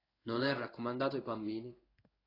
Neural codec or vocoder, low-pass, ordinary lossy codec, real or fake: codec, 16 kHz in and 24 kHz out, 1 kbps, XY-Tokenizer; 5.4 kHz; AAC, 24 kbps; fake